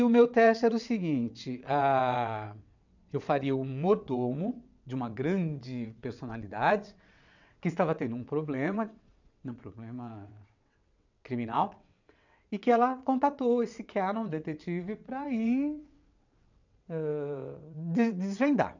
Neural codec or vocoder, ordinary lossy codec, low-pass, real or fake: vocoder, 22.05 kHz, 80 mel bands, WaveNeXt; none; 7.2 kHz; fake